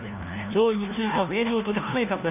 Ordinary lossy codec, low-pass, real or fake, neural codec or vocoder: none; 3.6 kHz; fake; codec, 16 kHz, 1 kbps, FunCodec, trained on LibriTTS, 50 frames a second